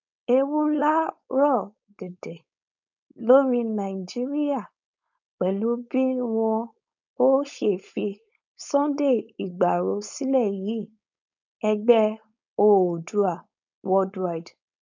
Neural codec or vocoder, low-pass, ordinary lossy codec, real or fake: codec, 16 kHz, 4.8 kbps, FACodec; 7.2 kHz; none; fake